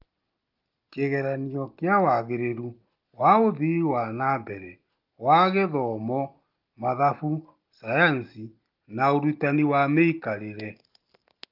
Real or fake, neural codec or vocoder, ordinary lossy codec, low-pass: real; none; Opus, 32 kbps; 5.4 kHz